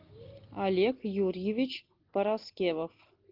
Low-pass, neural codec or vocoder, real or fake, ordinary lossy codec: 5.4 kHz; none; real; Opus, 32 kbps